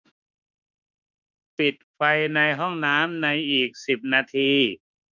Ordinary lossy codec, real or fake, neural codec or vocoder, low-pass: none; fake; autoencoder, 48 kHz, 32 numbers a frame, DAC-VAE, trained on Japanese speech; 7.2 kHz